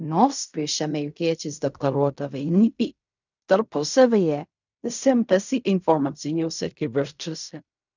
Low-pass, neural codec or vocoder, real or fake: 7.2 kHz; codec, 16 kHz in and 24 kHz out, 0.4 kbps, LongCat-Audio-Codec, fine tuned four codebook decoder; fake